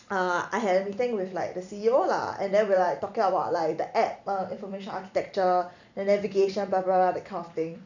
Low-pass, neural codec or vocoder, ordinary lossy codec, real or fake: 7.2 kHz; vocoder, 44.1 kHz, 128 mel bands every 256 samples, BigVGAN v2; none; fake